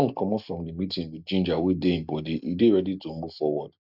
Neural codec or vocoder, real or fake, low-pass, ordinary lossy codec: none; real; 5.4 kHz; none